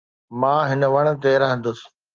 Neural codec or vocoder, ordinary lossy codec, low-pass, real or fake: none; Opus, 24 kbps; 7.2 kHz; real